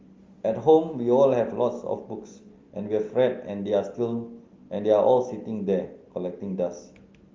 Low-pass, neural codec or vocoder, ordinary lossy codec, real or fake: 7.2 kHz; none; Opus, 32 kbps; real